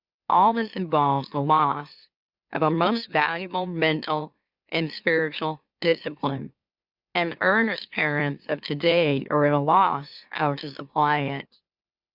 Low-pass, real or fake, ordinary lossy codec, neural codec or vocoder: 5.4 kHz; fake; Opus, 64 kbps; autoencoder, 44.1 kHz, a latent of 192 numbers a frame, MeloTTS